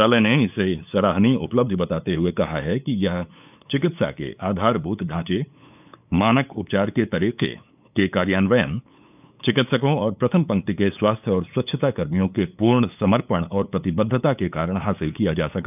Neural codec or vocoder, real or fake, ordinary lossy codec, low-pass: codec, 16 kHz, 8 kbps, FunCodec, trained on LibriTTS, 25 frames a second; fake; none; 3.6 kHz